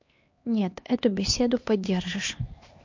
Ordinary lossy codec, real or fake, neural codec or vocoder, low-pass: MP3, 48 kbps; fake; codec, 16 kHz, 2 kbps, X-Codec, HuBERT features, trained on LibriSpeech; 7.2 kHz